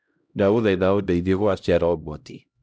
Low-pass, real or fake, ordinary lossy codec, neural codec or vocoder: none; fake; none; codec, 16 kHz, 0.5 kbps, X-Codec, HuBERT features, trained on LibriSpeech